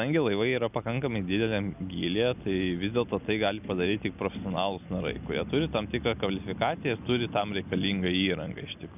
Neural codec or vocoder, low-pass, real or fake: none; 3.6 kHz; real